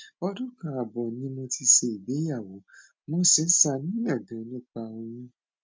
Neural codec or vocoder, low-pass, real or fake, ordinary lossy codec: none; none; real; none